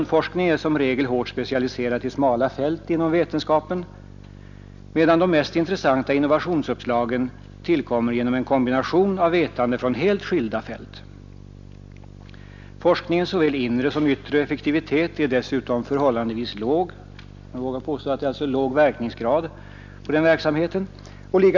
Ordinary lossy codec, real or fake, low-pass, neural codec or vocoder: none; real; 7.2 kHz; none